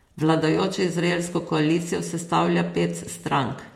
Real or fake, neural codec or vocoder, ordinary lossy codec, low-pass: fake; vocoder, 48 kHz, 128 mel bands, Vocos; MP3, 64 kbps; 19.8 kHz